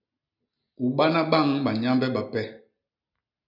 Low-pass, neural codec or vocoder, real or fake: 5.4 kHz; none; real